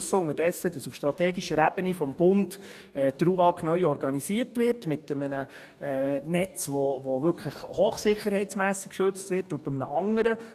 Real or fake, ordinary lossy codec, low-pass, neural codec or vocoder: fake; none; 14.4 kHz; codec, 44.1 kHz, 2.6 kbps, DAC